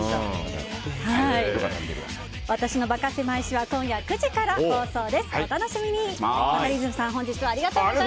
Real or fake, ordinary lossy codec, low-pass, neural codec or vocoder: real; none; none; none